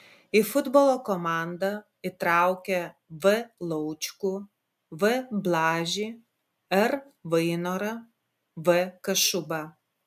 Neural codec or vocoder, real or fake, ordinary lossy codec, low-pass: none; real; MP3, 96 kbps; 14.4 kHz